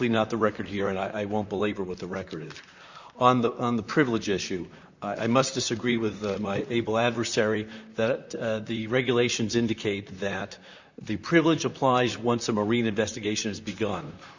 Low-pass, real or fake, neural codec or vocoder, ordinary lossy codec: 7.2 kHz; fake; vocoder, 44.1 kHz, 128 mel bands, Pupu-Vocoder; Opus, 64 kbps